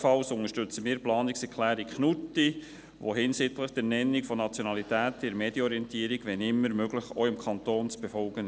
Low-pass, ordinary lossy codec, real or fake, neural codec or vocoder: none; none; real; none